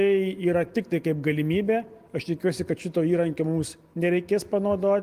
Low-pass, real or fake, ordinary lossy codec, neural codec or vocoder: 14.4 kHz; real; Opus, 24 kbps; none